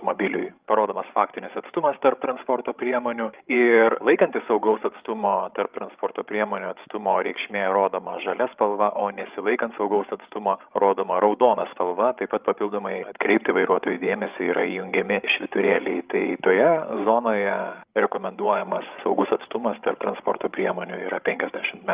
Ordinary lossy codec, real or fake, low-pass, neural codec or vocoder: Opus, 24 kbps; fake; 3.6 kHz; codec, 16 kHz, 16 kbps, FreqCodec, larger model